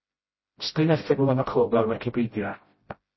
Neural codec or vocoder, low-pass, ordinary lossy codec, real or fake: codec, 16 kHz, 0.5 kbps, FreqCodec, smaller model; 7.2 kHz; MP3, 24 kbps; fake